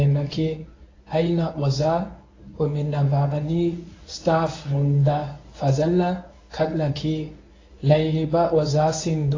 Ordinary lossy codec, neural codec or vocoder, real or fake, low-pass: AAC, 32 kbps; codec, 24 kHz, 0.9 kbps, WavTokenizer, medium speech release version 1; fake; 7.2 kHz